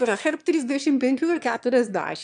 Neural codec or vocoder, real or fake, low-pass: autoencoder, 22.05 kHz, a latent of 192 numbers a frame, VITS, trained on one speaker; fake; 9.9 kHz